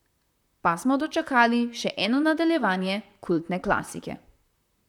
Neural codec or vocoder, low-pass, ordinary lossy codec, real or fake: vocoder, 44.1 kHz, 128 mel bands, Pupu-Vocoder; 19.8 kHz; none; fake